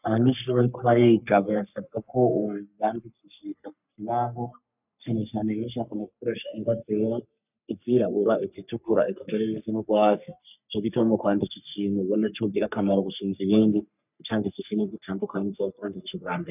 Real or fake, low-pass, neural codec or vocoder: fake; 3.6 kHz; codec, 44.1 kHz, 3.4 kbps, Pupu-Codec